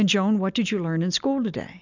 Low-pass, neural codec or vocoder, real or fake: 7.2 kHz; none; real